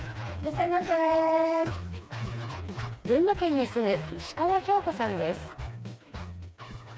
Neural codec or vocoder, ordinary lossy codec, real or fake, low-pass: codec, 16 kHz, 2 kbps, FreqCodec, smaller model; none; fake; none